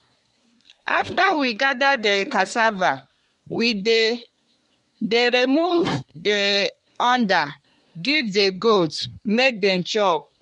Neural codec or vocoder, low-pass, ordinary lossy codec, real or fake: codec, 24 kHz, 1 kbps, SNAC; 10.8 kHz; MP3, 64 kbps; fake